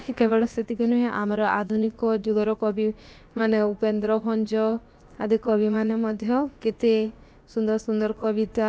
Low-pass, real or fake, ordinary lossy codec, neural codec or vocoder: none; fake; none; codec, 16 kHz, about 1 kbps, DyCAST, with the encoder's durations